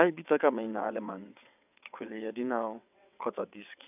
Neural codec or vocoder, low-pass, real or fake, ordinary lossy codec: vocoder, 22.05 kHz, 80 mel bands, WaveNeXt; 3.6 kHz; fake; none